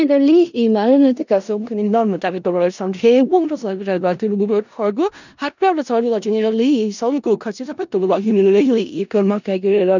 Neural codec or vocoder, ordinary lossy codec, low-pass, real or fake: codec, 16 kHz in and 24 kHz out, 0.4 kbps, LongCat-Audio-Codec, four codebook decoder; none; 7.2 kHz; fake